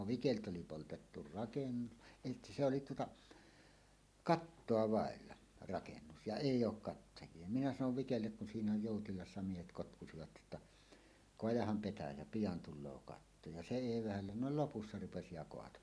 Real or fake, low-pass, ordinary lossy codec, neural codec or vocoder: real; none; none; none